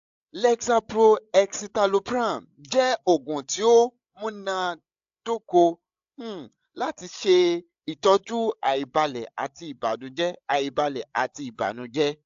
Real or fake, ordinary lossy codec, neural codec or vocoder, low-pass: fake; AAC, 64 kbps; codec, 16 kHz, 16 kbps, FreqCodec, larger model; 7.2 kHz